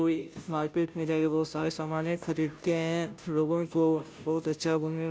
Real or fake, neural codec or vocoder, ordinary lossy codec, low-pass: fake; codec, 16 kHz, 0.5 kbps, FunCodec, trained on Chinese and English, 25 frames a second; none; none